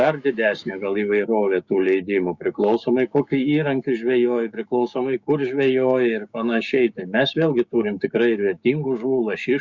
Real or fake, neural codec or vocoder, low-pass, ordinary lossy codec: fake; codec, 16 kHz, 6 kbps, DAC; 7.2 kHz; Opus, 64 kbps